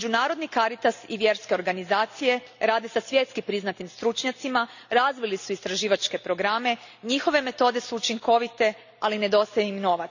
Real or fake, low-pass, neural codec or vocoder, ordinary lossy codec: real; 7.2 kHz; none; none